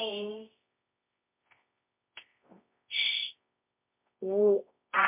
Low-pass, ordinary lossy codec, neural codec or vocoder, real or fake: 3.6 kHz; MP3, 32 kbps; codec, 24 kHz, 0.9 kbps, WavTokenizer, medium music audio release; fake